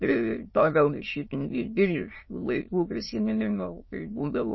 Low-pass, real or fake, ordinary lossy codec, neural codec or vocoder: 7.2 kHz; fake; MP3, 24 kbps; autoencoder, 22.05 kHz, a latent of 192 numbers a frame, VITS, trained on many speakers